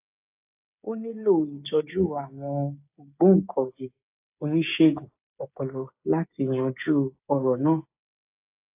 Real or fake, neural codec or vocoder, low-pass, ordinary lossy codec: fake; codec, 16 kHz, 8 kbps, FreqCodec, smaller model; 3.6 kHz; AAC, 32 kbps